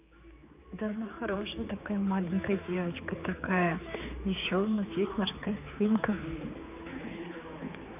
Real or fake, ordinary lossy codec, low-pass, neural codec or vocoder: fake; none; 3.6 kHz; codec, 16 kHz, 4 kbps, X-Codec, HuBERT features, trained on general audio